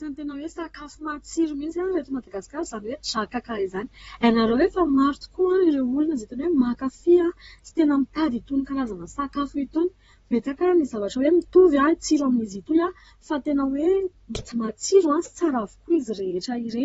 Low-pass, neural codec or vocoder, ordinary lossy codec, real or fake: 19.8 kHz; vocoder, 44.1 kHz, 128 mel bands, Pupu-Vocoder; AAC, 24 kbps; fake